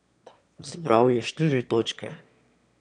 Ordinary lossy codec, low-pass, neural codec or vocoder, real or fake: none; 9.9 kHz; autoencoder, 22.05 kHz, a latent of 192 numbers a frame, VITS, trained on one speaker; fake